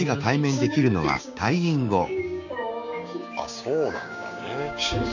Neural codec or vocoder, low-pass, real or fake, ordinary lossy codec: codec, 16 kHz, 6 kbps, DAC; 7.2 kHz; fake; none